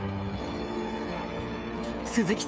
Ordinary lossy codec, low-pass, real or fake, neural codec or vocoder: none; none; fake; codec, 16 kHz, 16 kbps, FreqCodec, smaller model